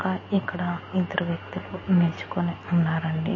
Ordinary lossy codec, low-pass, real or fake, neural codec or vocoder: MP3, 32 kbps; 7.2 kHz; real; none